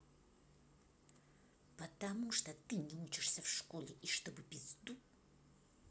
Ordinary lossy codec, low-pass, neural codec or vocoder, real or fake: none; none; none; real